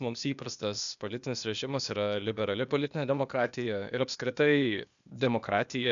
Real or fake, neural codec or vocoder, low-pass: fake; codec, 16 kHz, 0.8 kbps, ZipCodec; 7.2 kHz